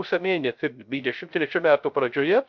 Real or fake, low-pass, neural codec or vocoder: fake; 7.2 kHz; codec, 16 kHz, 0.3 kbps, FocalCodec